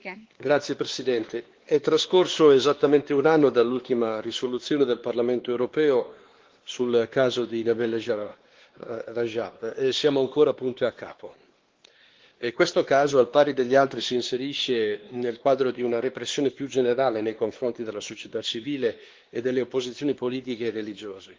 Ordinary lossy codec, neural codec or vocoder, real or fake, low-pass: Opus, 16 kbps; codec, 16 kHz, 2 kbps, X-Codec, WavLM features, trained on Multilingual LibriSpeech; fake; 7.2 kHz